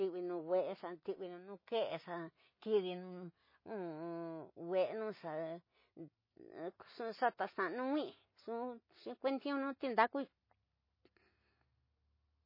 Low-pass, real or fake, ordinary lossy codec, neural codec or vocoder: 5.4 kHz; real; MP3, 24 kbps; none